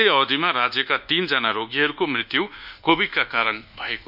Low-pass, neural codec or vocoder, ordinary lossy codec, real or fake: 5.4 kHz; codec, 24 kHz, 1.2 kbps, DualCodec; none; fake